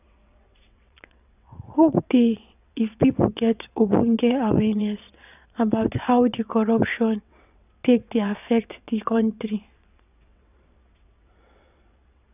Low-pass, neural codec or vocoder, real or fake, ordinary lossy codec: 3.6 kHz; vocoder, 22.05 kHz, 80 mel bands, WaveNeXt; fake; none